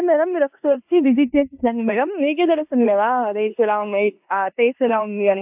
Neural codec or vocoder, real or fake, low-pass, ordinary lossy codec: codec, 16 kHz in and 24 kHz out, 0.9 kbps, LongCat-Audio-Codec, four codebook decoder; fake; 3.6 kHz; AAC, 32 kbps